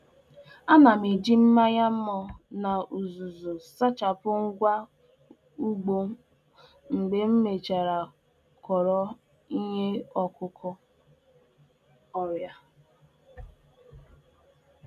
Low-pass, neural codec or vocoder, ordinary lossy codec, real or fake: 14.4 kHz; none; none; real